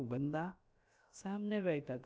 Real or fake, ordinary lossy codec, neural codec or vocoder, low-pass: fake; none; codec, 16 kHz, about 1 kbps, DyCAST, with the encoder's durations; none